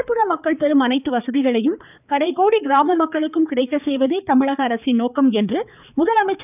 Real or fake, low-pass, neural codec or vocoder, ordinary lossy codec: fake; 3.6 kHz; codec, 16 kHz, 4 kbps, X-Codec, HuBERT features, trained on balanced general audio; none